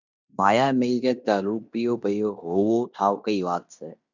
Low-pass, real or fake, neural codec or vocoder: 7.2 kHz; fake; codec, 16 kHz in and 24 kHz out, 0.9 kbps, LongCat-Audio-Codec, fine tuned four codebook decoder